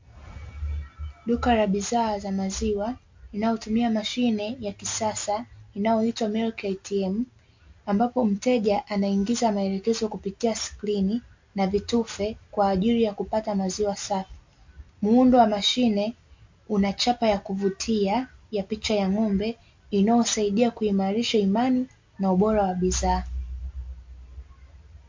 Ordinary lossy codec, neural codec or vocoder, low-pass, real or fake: MP3, 48 kbps; none; 7.2 kHz; real